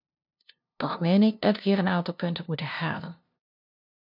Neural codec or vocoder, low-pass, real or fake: codec, 16 kHz, 0.5 kbps, FunCodec, trained on LibriTTS, 25 frames a second; 5.4 kHz; fake